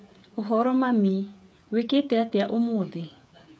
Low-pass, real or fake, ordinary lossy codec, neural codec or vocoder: none; fake; none; codec, 16 kHz, 8 kbps, FreqCodec, smaller model